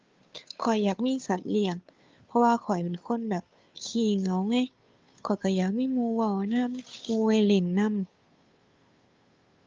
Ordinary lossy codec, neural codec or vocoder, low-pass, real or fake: Opus, 24 kbps; codec, 16 kHz, 2 kbps, FunCodec, trained on Chinese and English, 25 frames a second; 7.2 kHz; fake